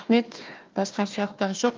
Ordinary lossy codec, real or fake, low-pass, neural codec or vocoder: Opus, 24 kbps; fake; 7.2 kHz; codec, 16 kHz, 1 kbps, FunCodec, trained on Chinese and English, 50 frames a second